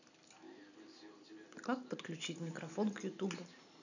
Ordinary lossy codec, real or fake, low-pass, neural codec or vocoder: none; real; 7.2 kHz; none